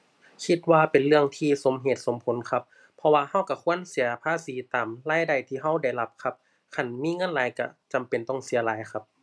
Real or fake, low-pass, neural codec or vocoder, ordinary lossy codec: real; none; none; none